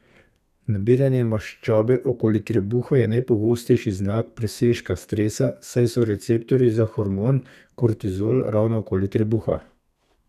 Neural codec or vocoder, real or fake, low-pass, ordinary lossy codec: codec, 32 kHz, 1.9 kbps, SNAC; fake; 14.4 kHz; none